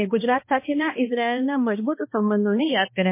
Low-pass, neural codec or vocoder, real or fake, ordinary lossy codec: 3.6 kHz; codec, 16 kHz, 1 kbps, X-Codec, HuBERT features, trained on balanced general audio; fake; MP3, 24 kbps